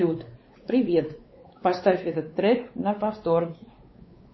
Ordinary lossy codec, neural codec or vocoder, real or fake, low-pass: MP3, 24 kbps; codec, 16 kHz, 4 kbps, X-Codec, WavLM features, trained on Multilingual LibriSpeech; fake; 7.2 kHz